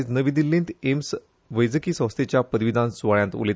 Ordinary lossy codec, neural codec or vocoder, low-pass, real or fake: none; none; none; real